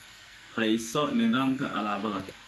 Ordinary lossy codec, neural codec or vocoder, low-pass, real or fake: none; codec, 44.1 kHz, 2.6 kbps, SNAC; 14.4 kHz; fake